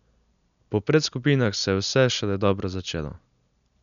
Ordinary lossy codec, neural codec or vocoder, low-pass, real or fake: none; none; 7.2 kHz; real